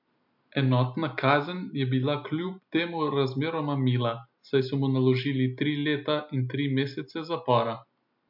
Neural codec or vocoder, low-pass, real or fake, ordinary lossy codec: none; 5.4 kHz; real; MP3, 48 kbps